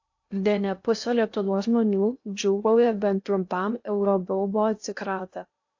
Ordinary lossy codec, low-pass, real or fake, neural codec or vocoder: AAC, 48 kbps; 7.2 kHz; fake; codec, 16 kHz in and 24 kHz out, 0.6 kbps, FocalCodec, streaming, 2048 codes